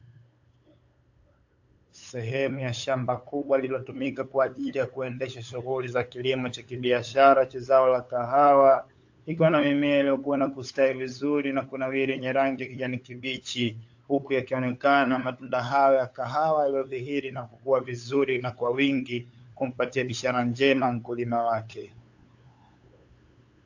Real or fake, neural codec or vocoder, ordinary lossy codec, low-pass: fake; codec, 16 kHz, 8 kbps, FunCodec, trained on LibriTTS, 25 frames a second; AAC, 48 kbps; 7.2 kHz